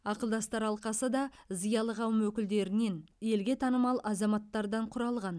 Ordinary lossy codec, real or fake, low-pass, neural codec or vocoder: none; real; none; none